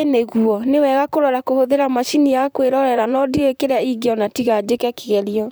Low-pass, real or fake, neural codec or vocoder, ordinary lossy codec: none; fake; vocoder, 44.1 kHz, 128 mel bands, Pupu-Vocoder; none